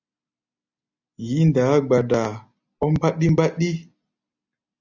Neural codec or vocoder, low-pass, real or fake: none; 7.2 kHz; real